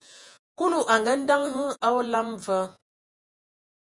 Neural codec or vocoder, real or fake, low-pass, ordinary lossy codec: vocoder, 48 kHz, 128 mel bands, Vocos; fake; 10.8 kHz; MP3, 96 kbps